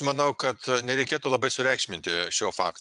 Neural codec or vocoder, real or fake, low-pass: vocoder, 22.05 kHz, 80 mel bands, Vocos; fake; 9.9 kHz